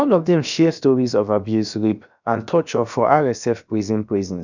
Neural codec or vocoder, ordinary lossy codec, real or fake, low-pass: codec, 16 kHz, about 1 kbps, DyCAST, with the encoder's durations; none; fake; 7.2 kHz